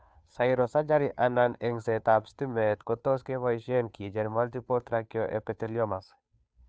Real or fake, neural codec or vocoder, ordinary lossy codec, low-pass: fake; codec, 16 kHz, 2 kbps, FunCodec, trained on Chinese and English, 25 frames a second; none; none